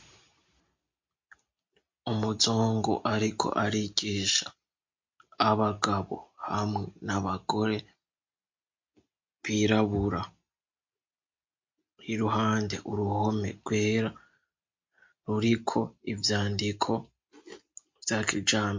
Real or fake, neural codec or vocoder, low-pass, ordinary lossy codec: real; none; 7.2 kHz; MP3, 48 kbps